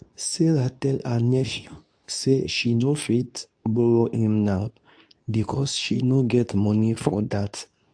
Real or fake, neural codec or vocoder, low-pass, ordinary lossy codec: fake; codec, 24 kHz, 0.9 kbps, WavTokenizer, medium speech release version 2; 9.9 kHz; AAC, 64 kbps